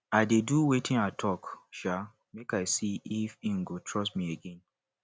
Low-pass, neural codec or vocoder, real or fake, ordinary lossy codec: none; none; real; none